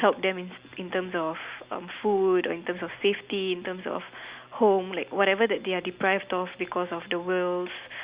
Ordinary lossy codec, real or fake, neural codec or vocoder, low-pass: Opus, 64 kbps; real; none; 3.6 kHz